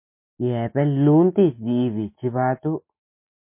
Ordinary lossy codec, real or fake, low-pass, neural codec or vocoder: MP3, 24 kbps; real; 3.6 kHz; none